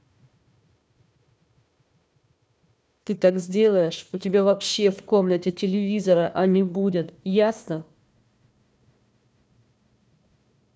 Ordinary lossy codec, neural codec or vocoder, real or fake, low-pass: none; codec, 16 kHz, 1 kbps, FunCodec, trained on Chinese and English, 50 frames a second; fake; none